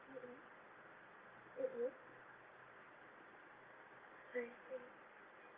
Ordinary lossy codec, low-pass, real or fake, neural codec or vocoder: Opus, 24 kbps; 3.6 kHz; real; none